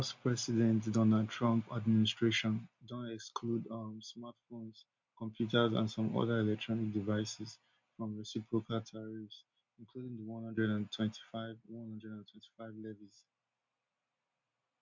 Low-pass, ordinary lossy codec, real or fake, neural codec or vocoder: 7.2 kHz; MP3, 64 kbps; real; none